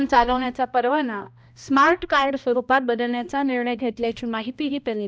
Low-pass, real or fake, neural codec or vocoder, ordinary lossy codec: none; fake; codec, 16 kHz, 1 kbps, X-Codec, HuBERT features, trained on balanced general audio; none